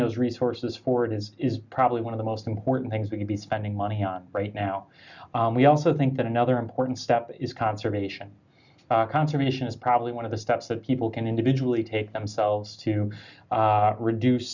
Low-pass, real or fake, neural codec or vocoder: 7.2 kHz; real; none